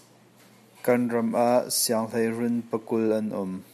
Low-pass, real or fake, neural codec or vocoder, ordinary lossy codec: 14.4 kHz; real; none; MP3, 96 kbps